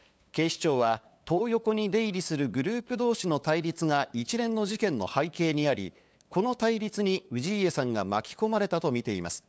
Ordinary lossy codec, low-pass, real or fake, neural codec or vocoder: none; none; fake; codec, 16 kHz, 8 kbps, FunCodec, trained on LibriTTS, 25 frames a second